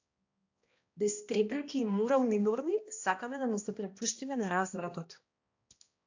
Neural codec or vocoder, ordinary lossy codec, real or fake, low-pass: codec, 16 kHz, 1 kbps, X-Codec, HuBERT features, trained on balanced general audio; AAC, 48 kbps; fake; 7.2 kHz